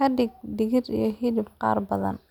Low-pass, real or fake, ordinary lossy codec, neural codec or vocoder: 19.8 kHz; real; none; none